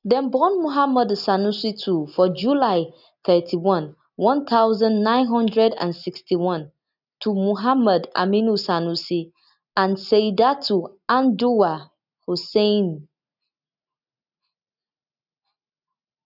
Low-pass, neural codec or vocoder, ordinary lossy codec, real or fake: 5.4 kHz; none; none; real